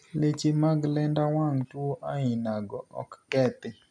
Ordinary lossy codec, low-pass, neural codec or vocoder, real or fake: none; none; none; real